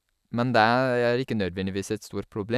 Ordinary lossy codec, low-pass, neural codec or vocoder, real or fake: none; 14.4 kHz; none; real